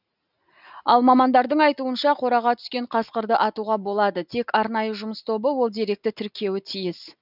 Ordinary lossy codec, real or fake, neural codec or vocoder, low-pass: none; real; none; 5.4 kHz